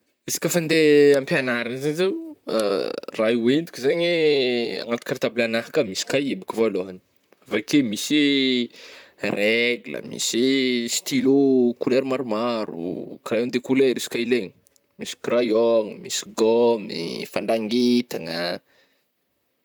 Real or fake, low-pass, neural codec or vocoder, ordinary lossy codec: fake; none; vocoder, 44.1 kHz, 128 mel bands, Pupu-Vocoder; none